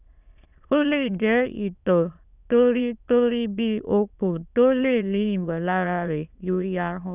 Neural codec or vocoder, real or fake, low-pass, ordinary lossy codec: autoencoder, 22.05 kHz, a latent of 192 numbers a frame, VITS, trained on many speakers; fake; 3.6 kHz; none